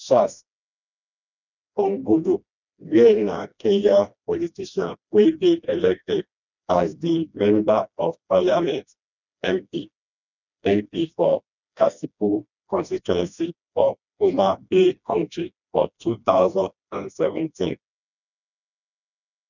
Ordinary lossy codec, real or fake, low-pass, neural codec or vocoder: none; fake; 7.2 kHz; codec, 16 kHz, 1 kbps, FreqCodec, smaller model